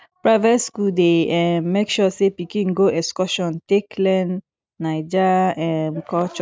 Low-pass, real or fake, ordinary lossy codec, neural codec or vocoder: none; real; none; none